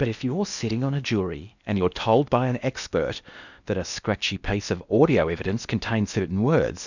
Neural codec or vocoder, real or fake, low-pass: codec, 16 kHz in and 24 kHz out, 0.8 kbps, FocalCodec, streaming, 65536 codes; fake; 7.2 kHz